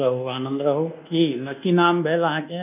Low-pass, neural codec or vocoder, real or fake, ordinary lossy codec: 3.6 kHz; codec, 24 kHz, 1.2 kbps, DualCodec; fake; none